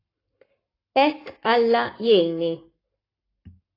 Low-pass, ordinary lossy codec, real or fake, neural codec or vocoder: 5.4 kHz; AAC, 24 kbps; fake; codec, 44.1 kHz, 7.8 kbps, Pupu-Codec